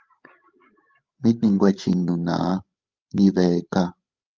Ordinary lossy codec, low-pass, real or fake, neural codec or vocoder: Opus, 32 kbps; 7.2 kHz; fake; codec, 16 kHz, 16 kbps, FreqCodec, larger model